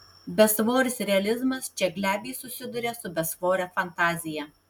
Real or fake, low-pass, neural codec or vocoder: real; 19.8 kHz; none